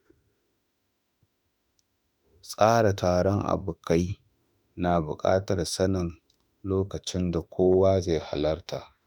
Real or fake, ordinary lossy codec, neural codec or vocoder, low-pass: fake; none; autoencoder, 48 kHz, 32 numbers a frame, DAC-VAE, trained on Japanese speech; 19.8 kHz